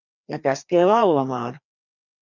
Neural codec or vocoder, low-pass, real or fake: codec, 16 kHz, 2 kbps, FreqCodec, larger model; 7.2 kHz; fake